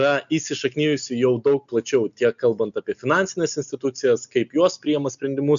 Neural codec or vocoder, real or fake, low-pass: none; real; 7.2 kHz